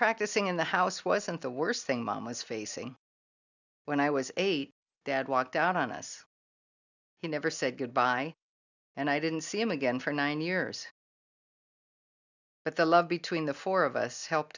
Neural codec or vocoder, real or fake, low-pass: none; real; 7.2 kHz